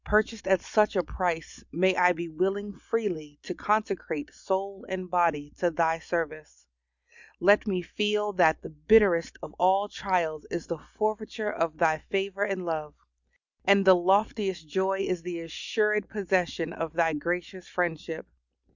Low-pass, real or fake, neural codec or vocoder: 7.2 kHz; real; none